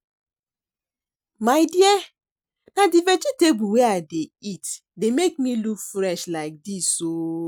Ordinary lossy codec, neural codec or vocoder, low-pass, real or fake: none; none; none; real